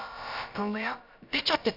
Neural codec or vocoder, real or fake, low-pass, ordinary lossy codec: codec, 16 kHz, about 1 kbps, DyCAST, with the encoder's durations; fake; 5.4 kHz; none